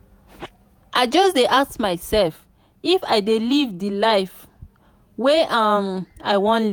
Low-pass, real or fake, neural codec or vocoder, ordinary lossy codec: none; fake; vocoder, 48 kHz, 128 mel bands, Vocos; none